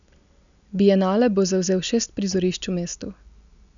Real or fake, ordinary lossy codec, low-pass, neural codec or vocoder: real; none; 7.2 kHz; none